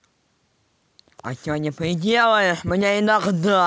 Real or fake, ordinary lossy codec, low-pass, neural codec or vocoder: real; none; none; none